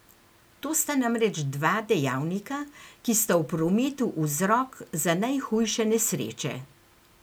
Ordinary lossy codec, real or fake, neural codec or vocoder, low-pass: none; real; none; none